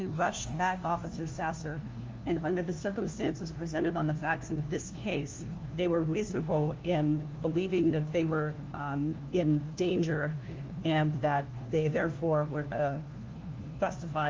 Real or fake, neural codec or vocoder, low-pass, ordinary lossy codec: fake; codec, 16 kHz, 1 kbps, FunCodec, trained on LibriTTS, 50 frames a second; 7.2 kHz; Opus, 32 kbps